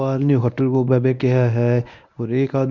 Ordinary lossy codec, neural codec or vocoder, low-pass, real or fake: none; codec, 24 kHz, 0.9 kbps, WavTokenizer, medium speech release version 1; 7.2 kHz; fake